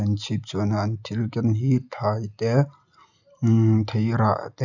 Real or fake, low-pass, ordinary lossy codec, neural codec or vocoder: real; 7.2 kHz; none; none